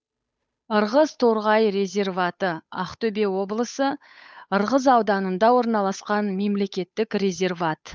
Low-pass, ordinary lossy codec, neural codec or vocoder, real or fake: none; none; codec, 16 kHz, 8 kbps, FunCodec, trained on Chinese and English, 25 frames a second; fake